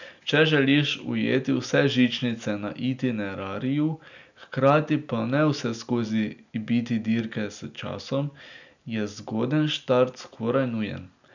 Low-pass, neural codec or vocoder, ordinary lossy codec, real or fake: 7.2 kHz; none; none; real